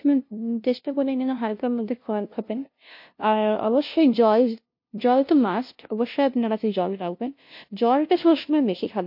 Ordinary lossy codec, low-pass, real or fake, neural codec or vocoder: MP3, 32 kbps; 5.4 kHz; fake; codec, 16 kHz, 0.5 kbps, FunCodec, trained on LibriTTS, 25 frames a second